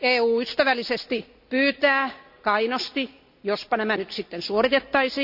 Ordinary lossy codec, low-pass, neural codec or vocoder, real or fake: none; 5.4 kHz; none; real